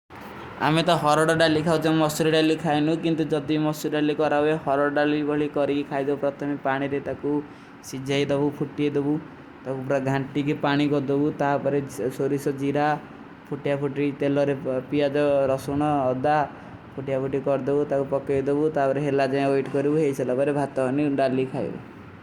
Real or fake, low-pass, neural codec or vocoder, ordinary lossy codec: real; 19.8 kHz; none; Opus, 64 kbps